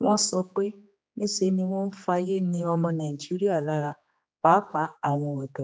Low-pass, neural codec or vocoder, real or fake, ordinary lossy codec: none; codec, 16 kHz, 2 kbps, X-Codec, HuBERT features, trained on general audio; fake; none